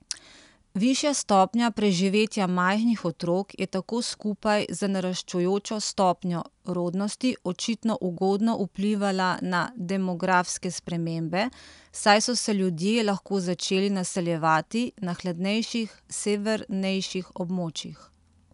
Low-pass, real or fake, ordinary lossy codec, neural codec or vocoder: 10.8 kHz; real; none; none